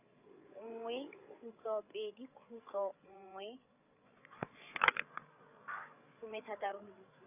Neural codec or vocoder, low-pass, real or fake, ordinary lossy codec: codec, 16 kHz, 8 kbps, FreqCodec, larger model; 3.6 kHz; fake; none